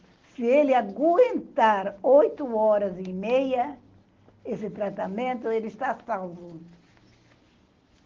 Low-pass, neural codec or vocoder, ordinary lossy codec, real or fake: 7.2 kHz; none; Opus, 16 kbps; real